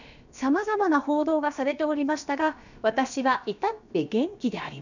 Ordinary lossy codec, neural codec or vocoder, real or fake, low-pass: none; codec, 16 kHz, about 1 kbps, DyCAST, with the encoder's durations; fake; 7.2 kHz